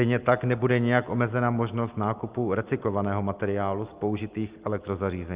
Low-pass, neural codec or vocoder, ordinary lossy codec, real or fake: 3.6 kHz; none; Opus, 32 kbps; real